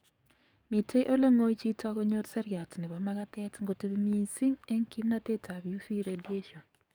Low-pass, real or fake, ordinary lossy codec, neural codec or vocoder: none; fake; none; codec, 44.1 kHz, 7.8 kbps, DAC